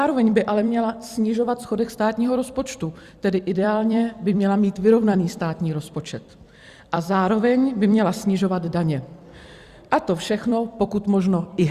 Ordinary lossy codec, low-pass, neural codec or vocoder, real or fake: Opus, 64 kbps; 14.4 kHz; vocoder, 44.1 kHz, 128 mel bands every 256 samples, BigVGAN v2; fake